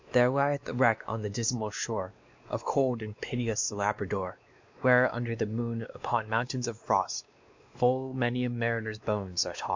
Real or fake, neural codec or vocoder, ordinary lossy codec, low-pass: fake; codec, 16 kHz, 2 kbps, X-Codec, WavLM features, trained on Multilingual LibriSpeech; MP3, 64 kbps; 7.2 kHz